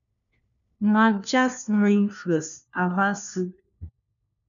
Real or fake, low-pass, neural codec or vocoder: fake; 7.2 kHz; codec, 16 kHz, 1 kbps, FunCodec, trained on LibriTTS, 50 frames a second